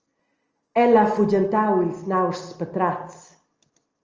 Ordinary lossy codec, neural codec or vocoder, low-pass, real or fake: Opus, 24 kbps; none; 7.2 kHz; real